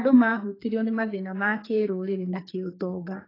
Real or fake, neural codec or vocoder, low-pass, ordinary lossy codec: fake; codec, 44.1 kHz, 2.6 kbps, SNAC; 5.4 kHz; AAC, 24 kbps